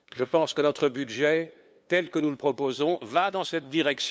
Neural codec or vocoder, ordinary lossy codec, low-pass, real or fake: codec, 16 kHz, 2 kbps, FunCodec, trained on LibriTTS, 25 frames a second; none; none; fake